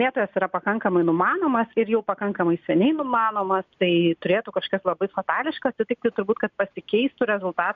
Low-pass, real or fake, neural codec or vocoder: 7.2 kHz; real; none